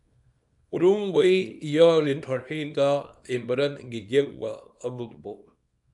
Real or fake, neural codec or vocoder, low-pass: fake; codec, 24 kHz, 0.9 kbps, WavTokenizer, small release; 10.8 kHz